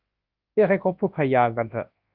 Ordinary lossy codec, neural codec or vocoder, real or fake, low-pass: Opus, 24 kbps; codec, 24 kHz, 0.9 kbps, WavTokenizer, large speech release; fake; 5.4 kHz